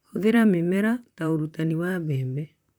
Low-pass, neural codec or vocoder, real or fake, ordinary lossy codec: 19.8 kHz; vocoder, 44.1 kHz, 128 mel bands, Pupu-Vocoder; fake; none